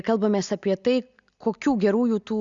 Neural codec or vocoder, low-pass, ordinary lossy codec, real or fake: none; 7.2 kHz; Opus, 64 kbps; real